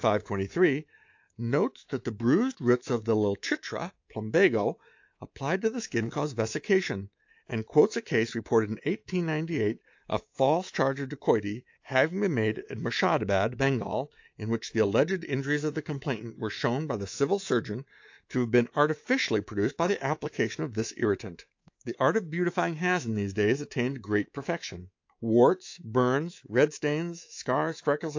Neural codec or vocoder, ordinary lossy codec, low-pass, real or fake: codec, 24 kHz, 3.1 kbps, DualCodec; AAC, 48 kbps; 7.2 kHz; fake